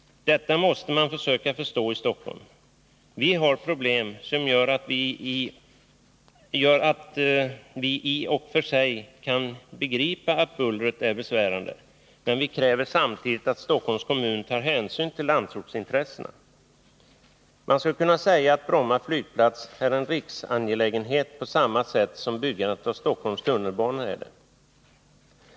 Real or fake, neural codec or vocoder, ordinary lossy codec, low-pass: real; none; none; none